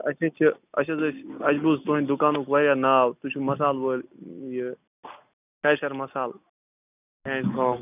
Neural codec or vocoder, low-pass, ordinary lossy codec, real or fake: none; 3.6 kHz; none; real